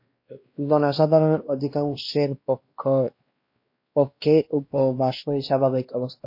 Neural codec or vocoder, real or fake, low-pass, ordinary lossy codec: codec, 16 kHz, 1 kbps, X-Codec, WavLM features, trained on Multilingual LibriSpeech; fake; 5.4 kHz; MP3, 32 kbps